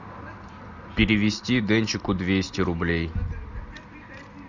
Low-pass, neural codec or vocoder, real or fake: 7.2 kHz; none; real